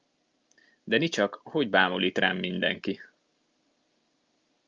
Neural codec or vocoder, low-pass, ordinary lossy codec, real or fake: none; 7.2 kHz; Opus, 24 kbps; real